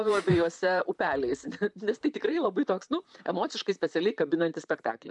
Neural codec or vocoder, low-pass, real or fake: vocoder, 44.1 kHz, 128 mel bands, Pupu-Vocoder; 10.8 kHz; fake